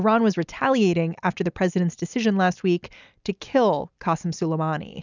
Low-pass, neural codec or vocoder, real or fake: 7.2 kHz; none; real